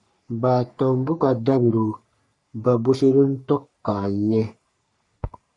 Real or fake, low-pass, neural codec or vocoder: fake; 10.8 kHz; codec, 44.1 kHz, 3.4 kbps, Pupu-Codec